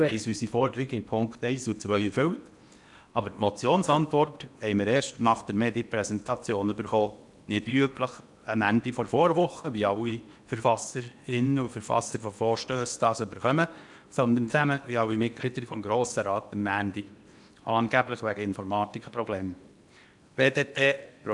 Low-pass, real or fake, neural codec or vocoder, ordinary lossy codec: 10.8 kHz; fake; codec, 16 kHz in and 24 kHz out, 0.8 kbps, FocalCodec, streaming, 65536 codes; none